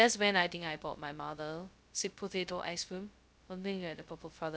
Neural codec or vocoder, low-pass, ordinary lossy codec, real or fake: codec, 16 kHz, 0.2 kbps, FocalCodec; none; none; fake